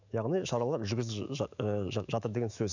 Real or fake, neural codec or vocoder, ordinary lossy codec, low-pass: fake; autoencoder, 48 kHz, 128 numbers a frame, DAC-VAE, trained on Japanese speech; none; 7.2 kHz